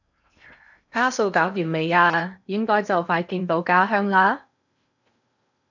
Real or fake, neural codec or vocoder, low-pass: fake; codec, 16 kHz in and 24 kHz out, 0.8 kbps, FocalCodec, streaming, 65536 codes; 7.2 kHz